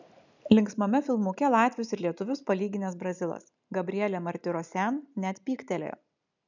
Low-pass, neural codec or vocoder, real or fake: 7.2 kHz; none; real